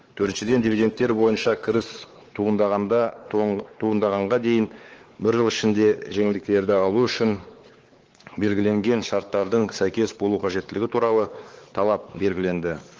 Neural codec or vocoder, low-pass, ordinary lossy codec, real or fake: codec, 16 kHz, 4 kbps, X-Codec, WavLM features, trained on Multilingual LibriSpeech; 7.2 kHz; Opus, 16 kbps; fake